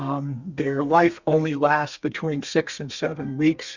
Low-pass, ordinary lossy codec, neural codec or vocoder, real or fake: 7.2 kHz; Opus, 64 kbps; codec, 32 kHz, 1.9 kbps, SNAC; fake